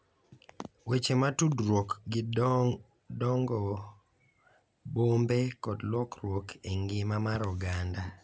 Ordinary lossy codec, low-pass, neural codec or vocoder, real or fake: none; none; none; real